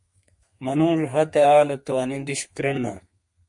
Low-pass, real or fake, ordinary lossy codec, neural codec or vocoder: 10.8 kHz; fake; MP3, 48 kbps; codec, 32 kHz, 1.9 kbps, SNAC